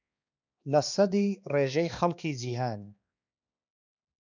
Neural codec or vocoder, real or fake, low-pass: codec, 16 kHz, 2 kbps, X-Codec, HuBERT features, trained on balanced general audio; fake; 7.2 kHz